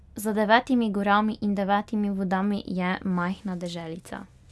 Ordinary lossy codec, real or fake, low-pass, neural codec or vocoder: none; real; none; none